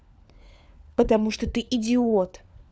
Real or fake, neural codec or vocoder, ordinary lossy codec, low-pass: fake; codec, 16 kHz, 4 kbps, FunCodec, trained on LibriTTS, 50 frames a second; none; none